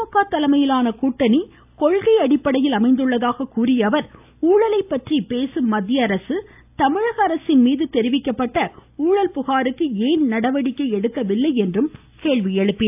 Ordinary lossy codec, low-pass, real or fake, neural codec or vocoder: AAC, 32 kbps; 3.6 kHz; real; none